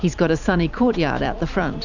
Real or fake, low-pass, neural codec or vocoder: fake; 7.2 kHz; vocoder, 44.1 kHz, 128 mel bands every 256 samples, BigVGAN v2